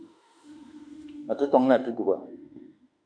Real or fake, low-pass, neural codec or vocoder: fake; 9.9 kHz; autoencoder, 48 kHz, 32 numbers a frame, DAC-VAE, trained on Japanese speech